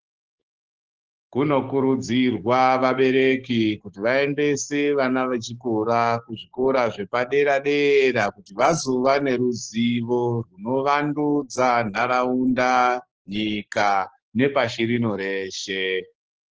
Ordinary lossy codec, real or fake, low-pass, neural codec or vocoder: Opus, 16 kbps; fake; 7.2 kHz; codec, 16 kHz, 6 kbps, DAC